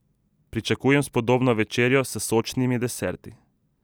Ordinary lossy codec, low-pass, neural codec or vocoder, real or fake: none; none; none; real